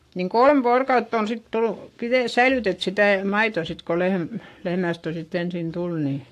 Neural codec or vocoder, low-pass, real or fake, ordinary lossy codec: codec, 44.1 kHz, 7.8 kbps, Pupu-Codec; 14.4 kHz; fake; AAC, 64 kbps